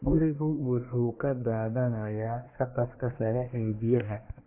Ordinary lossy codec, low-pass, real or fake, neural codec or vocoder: AAC, 24 kbps; 3.6 kHz; fake; codec, 24 kHz, 1 kbps, SNAC